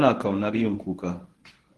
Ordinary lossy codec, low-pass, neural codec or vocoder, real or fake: Opus, 16 kbps; 10.8 kHz; codec, 24 kHz, 0.9 kbps, WavTokenizer, medium speech release version 1; fake